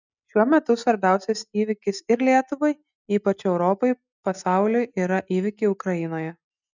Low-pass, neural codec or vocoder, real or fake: 7.2 kHz; none; real